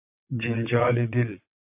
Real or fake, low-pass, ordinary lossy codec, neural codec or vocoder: fake; 3.6 kHz; AAC, 32 kbps; vocoder, 22.05 kHz, 80 mel bands, WaveNeXt